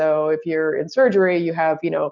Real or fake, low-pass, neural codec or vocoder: real; 7.2 kHz; none